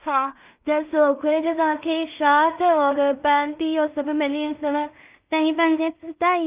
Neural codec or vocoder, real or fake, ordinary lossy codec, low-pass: codec, 16 kHz in and 24 kHz out, 0.4 kbps, LongCat-Audio-Codec, two codebook decoder; fake; Opus, 32 kbps; 3.6 kHz